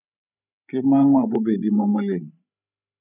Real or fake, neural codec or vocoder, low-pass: fake; codec, 16 kHz, 16 kbps, FreqCodec, larger model; 3.6 kHz